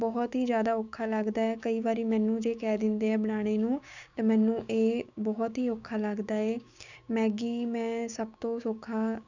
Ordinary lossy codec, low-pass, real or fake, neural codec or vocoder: none; 7.2 kHz; fake; codec, 16 kHz, 6 kbps, DAC